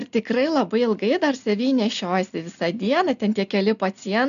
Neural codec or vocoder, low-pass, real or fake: none; 7.2 kHz; real